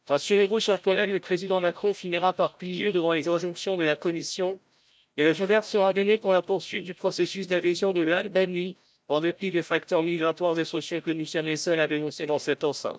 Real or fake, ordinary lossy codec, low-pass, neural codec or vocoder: fake; none; none; codec, 16 kHz, 0.5 kbps, FreqCodec, larger model